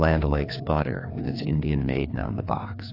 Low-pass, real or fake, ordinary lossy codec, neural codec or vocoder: 5.4 kHz; fake; AAC, 32 kbps; codec, 16 kHz, 2 kbps, FreqCodec, larger model